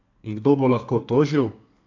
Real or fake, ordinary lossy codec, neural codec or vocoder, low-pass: fake; AAC, 48 kbps; codec, 32 kHz, 1.9 kbps, SNAC; 7.2 kHz